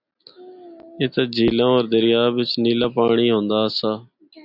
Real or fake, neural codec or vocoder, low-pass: real; none; 5.4 kHz